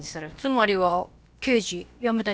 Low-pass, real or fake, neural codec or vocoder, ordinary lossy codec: none; fake; codec, 16 kHz, about 1 kbps, DyCAST, with the encoder's durations; none